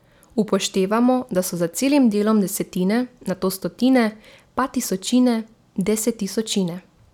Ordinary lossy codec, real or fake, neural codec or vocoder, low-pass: none; real; none; 19.8 kHz